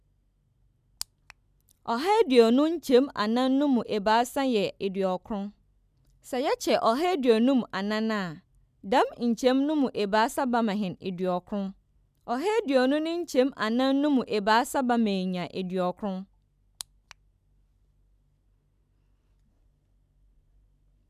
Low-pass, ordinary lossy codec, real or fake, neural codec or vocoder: 14.4 kHz; none; real; none